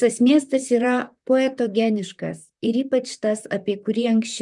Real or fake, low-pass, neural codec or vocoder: fake; 10.8 kHz; vocoder, 44.1 kHz, 128 mel bands, Pupu-Vocoder